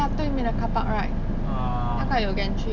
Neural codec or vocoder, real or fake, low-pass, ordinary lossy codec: none; real; 7.2 kHz; none